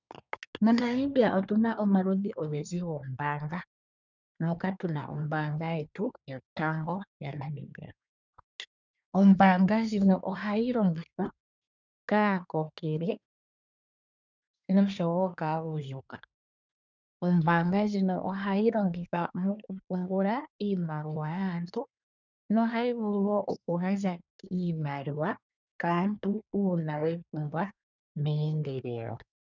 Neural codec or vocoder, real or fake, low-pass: codec, 24 kHz, 1 kbps, SNAC; fake; 7.2 kHz